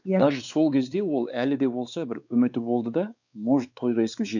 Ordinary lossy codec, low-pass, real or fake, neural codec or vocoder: none; 7.2 kHz; fake; codec, 16 kHz, 4 kbps, X-Codec, WavLM features, trained on Multilingual LibriSpeech